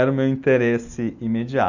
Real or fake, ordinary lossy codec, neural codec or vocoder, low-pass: real; none; none; 7.2 kHz